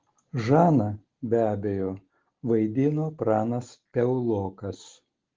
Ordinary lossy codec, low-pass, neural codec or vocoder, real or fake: Opus, 16 kbps; 7.2 kHz; none; real